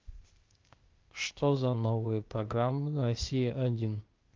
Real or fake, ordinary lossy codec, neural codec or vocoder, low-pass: fake; Opus, 32 kbps; codec, 16 kHz, 0.8 kbps, ZipCodec; 7.2 kHz